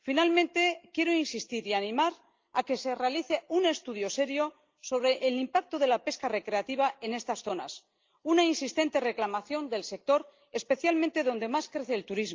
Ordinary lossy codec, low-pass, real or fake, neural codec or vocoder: Opus, 32 kbps; 7.2 kHz; real; none